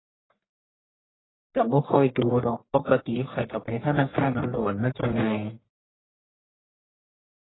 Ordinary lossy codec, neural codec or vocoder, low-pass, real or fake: AAC, 16 kbps; codec, 44.1 kHz, 1.7 kbps, Pupu-Codec; 7.2 kHz; fake